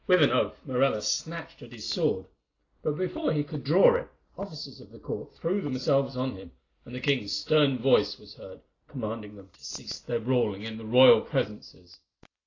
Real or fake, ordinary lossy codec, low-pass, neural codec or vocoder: real; AAC, 32 kbps; 7.2 kHz; none